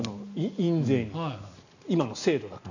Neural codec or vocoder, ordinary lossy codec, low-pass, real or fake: none; none; 7.2 kHz; real